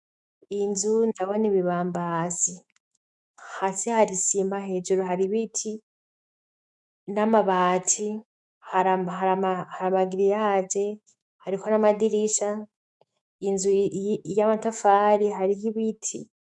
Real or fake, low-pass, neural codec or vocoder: fake; 10.8 kHz; autoencoder, 48 kHz, 128 numbers a frame, DAC-VAE, trained on Japanese speech